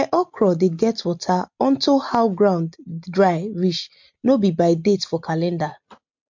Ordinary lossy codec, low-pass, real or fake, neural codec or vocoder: MP3, 48 kbps; 7.2 kHz; real; none